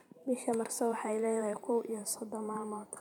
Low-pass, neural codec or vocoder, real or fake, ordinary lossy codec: 19.8 kHz; vocoder, 44.1 kHz, 128 mel bands every 512 samples, BigVGAN v2; fake; none